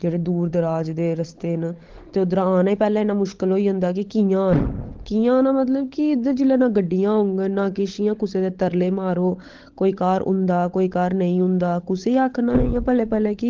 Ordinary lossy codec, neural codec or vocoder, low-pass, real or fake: Opus, 16 kbps; codec, 16 kHz, 16 kbps, FunCodec, trained on LibriTTS, 50 frames a second; 7.2 kHz; fake